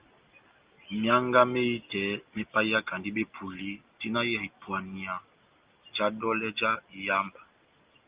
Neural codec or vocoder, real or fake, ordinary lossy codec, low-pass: none; real; Opus, 32 kbps; 3.6 kHz